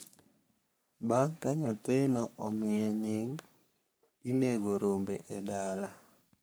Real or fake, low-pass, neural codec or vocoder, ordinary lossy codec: fake; none; codec, 44.1 kHz, 3.4 kbps, Pupu-Codec; none